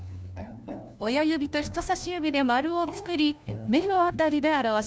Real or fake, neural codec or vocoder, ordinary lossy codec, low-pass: fake; codec, 16 kHz, 1 kbps, FunCodec, trained on LibriTTS, 50 frames a second; none; none